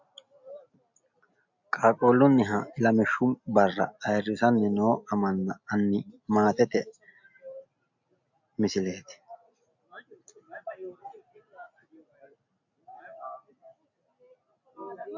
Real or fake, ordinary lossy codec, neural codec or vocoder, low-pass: real; MP3, 64 kbps; none; 7.2 kHz